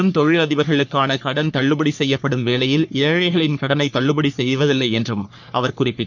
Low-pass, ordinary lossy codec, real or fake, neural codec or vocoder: 7.2 kHz; none; fake; codec, 44.1 kHz, 3.4 kbps, Pupu-Codec